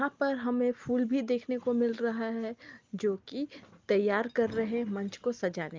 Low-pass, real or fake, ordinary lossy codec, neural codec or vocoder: 7.2 kHz; real; Opus, 32 kbps; none